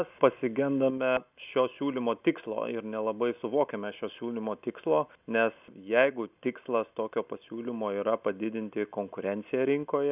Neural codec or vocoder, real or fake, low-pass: none; real; 3.6 kHz